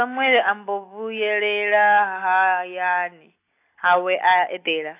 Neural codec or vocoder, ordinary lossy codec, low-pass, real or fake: none; AAC, 24 kbps; 3.6 kHz; real